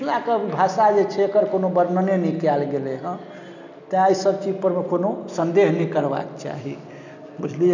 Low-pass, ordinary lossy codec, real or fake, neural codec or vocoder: 7.2 kHz; none; real; none